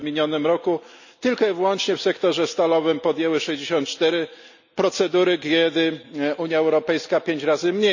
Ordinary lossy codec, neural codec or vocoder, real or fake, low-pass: none; none; real; 7.2 kHz